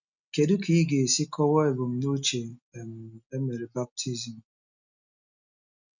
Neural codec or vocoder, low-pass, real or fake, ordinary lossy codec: none; 7.2 kHz; real; none